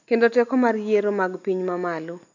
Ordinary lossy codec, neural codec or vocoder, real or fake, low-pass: none; none; real; 7.2 kHz